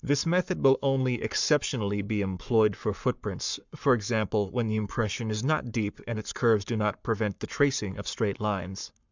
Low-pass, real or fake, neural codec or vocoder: 7.2 kHz; fake; codec, 44.1 kHz, 7.8 kbps, Pupu-Codec